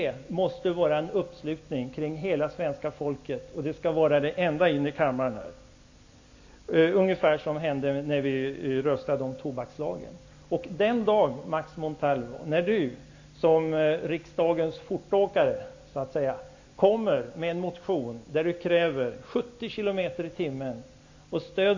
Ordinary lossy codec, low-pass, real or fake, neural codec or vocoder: AAC, 48 kbps; 7.2 kHz; real; none